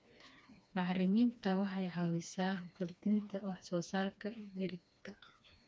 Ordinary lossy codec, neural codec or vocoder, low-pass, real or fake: none; codec, 16 kHz, 2 kbps, FreqCodec, smaller model; none; fake